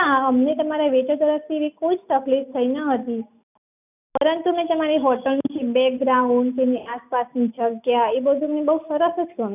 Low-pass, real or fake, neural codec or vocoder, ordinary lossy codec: 3.6 kHz; real; none; none